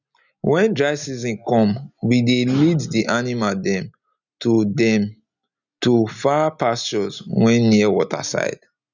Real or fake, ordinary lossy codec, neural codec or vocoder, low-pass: real; none; none; 7.2 kHz